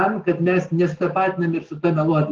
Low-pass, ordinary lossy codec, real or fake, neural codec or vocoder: 7.2 kHz; Opus, 16 kbps; real; none